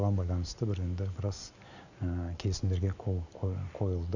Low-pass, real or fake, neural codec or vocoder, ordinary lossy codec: 7.2 kHz; real; none; none